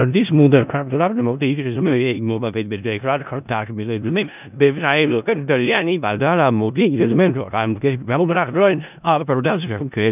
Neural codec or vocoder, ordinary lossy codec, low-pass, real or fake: codec, 16 kHz in and 24 kHz out, 0.4 kbps, LongCat-Audio-Codec, four codebook decoder; none; 3.6 kHz; fake